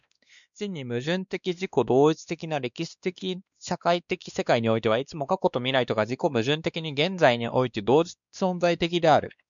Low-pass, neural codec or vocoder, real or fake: 7.2 kHz; codec, 16 kHz, 2 kbps, X-Codec, WavLM features, trained on Multilingual LibriSpeech; fake